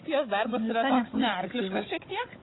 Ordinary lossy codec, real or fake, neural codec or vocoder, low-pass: AAC, 16 kbps; fake; codec, 16 kHz, 16 kbps, FunCodec, trained on Chinese and English, 50 frames a second; 7.2 kHz